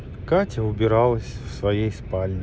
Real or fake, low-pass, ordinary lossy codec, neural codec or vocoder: real; none; none; none